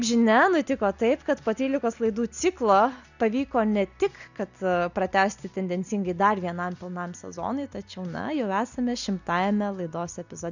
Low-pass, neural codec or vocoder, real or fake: 7.2 kHz; none; real